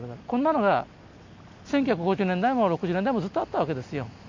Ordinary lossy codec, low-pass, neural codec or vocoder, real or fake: MP3, 48 kbps; 7.2 kHz; none; real